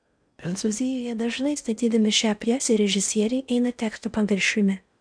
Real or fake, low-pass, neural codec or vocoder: fake; 9.9 kHz; codec, 16 kHz in and 24 kHz out, 0.6 kbps, FocalCodec, streaming, 4096 codes